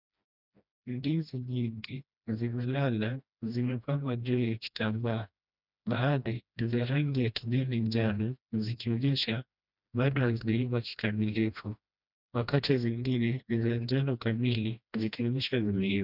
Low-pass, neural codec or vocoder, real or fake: 5.4 kHz; codec, 16 kHz, 1 kbps, FreqCodec, smaller model; fake